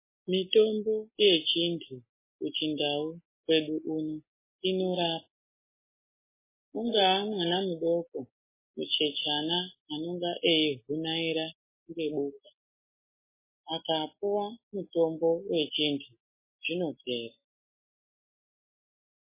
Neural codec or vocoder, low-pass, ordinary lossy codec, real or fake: none; 3.6 kHz; MP3, 16 kbps; real